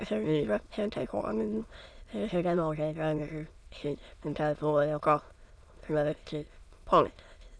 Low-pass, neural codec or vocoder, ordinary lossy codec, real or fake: none; autoencoder, 22.05 kHz, a latent of 192 numbers a frame, VITS, trained on many speakers; none; fake